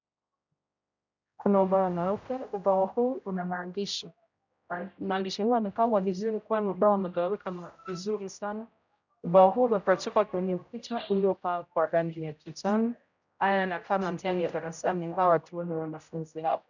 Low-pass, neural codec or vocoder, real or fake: 7.2 kHz; codec, 16 kHz, 0.5 kbps, X-Codec, HuBERT features, trained on general audio; fake